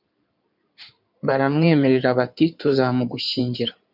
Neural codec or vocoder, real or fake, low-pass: codec, 16 kHz in and 24 kHz out, 2.2 kbps, FireRedTTS-2 codec; fake; 5.4 kHz